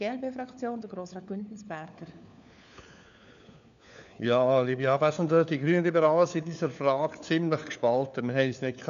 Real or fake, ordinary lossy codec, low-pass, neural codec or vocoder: fake; none; 7.2 kHz; codec, 16 kHz, 4 kbps, FunCodec, trained on LibriTTS, 50 frames a second